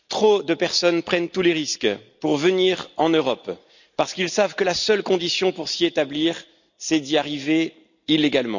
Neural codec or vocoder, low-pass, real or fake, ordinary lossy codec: none; 7.2 kHz; real; none